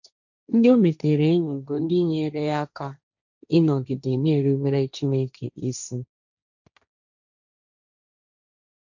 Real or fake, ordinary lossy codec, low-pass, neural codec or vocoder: fake; none; 7.2 kHz; codec, 16 kHz, 1.1 kbps, Voila-Tokenizer